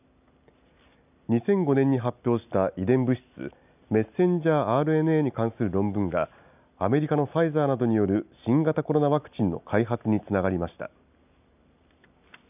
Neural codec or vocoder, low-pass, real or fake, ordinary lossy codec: none; 3.6 kHz; real; none